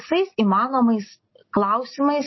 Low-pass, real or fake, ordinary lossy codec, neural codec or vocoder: 7.2 kHz; real; MP3, 24 kbps; none